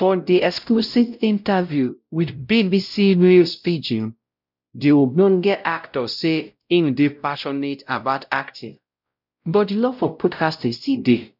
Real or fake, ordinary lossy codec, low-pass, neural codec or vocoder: fake; none; 5.4 kHz; codec, 16 kHz, 0.5 kbps, X-Codec, WavLM features, trained on Multilingual LibriSpeech